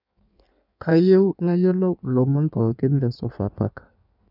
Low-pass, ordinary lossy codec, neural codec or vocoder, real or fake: 5.4 kHz; none; codec, 16 kHz in and 24 kHz out, 1.1 kbps, FireRedTTS-2 codec; fake